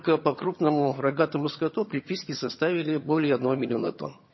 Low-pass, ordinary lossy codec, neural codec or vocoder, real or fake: 7.2 kHz; MP3, 24 kbps; vocoder, 22.05 kHz, 80 mel bands, HiFi-GAN; fake